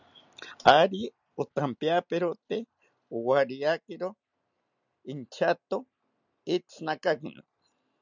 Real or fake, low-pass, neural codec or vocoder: real; 7.2 kHz; none